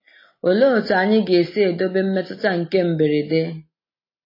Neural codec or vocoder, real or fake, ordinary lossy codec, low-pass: none; real; MP3, 24 kbps; 5.4 kHz